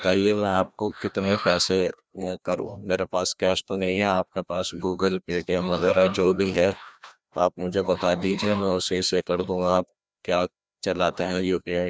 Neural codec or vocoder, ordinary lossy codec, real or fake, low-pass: codec, 16 kHz, 1 kbps, FreqCodec, larger model; none; fake; none